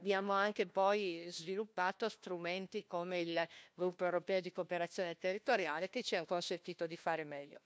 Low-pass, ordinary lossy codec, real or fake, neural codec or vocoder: none; none; fake; codec, 16 kHz, 1 kbps, FunCodec, trained on LibriTTS, 50 frames a second